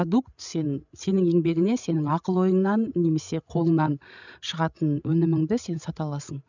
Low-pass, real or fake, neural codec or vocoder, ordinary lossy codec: 7.2 kHz; fake; codec, 16 kHz, 16 kbps, FreqCodec, larger model; none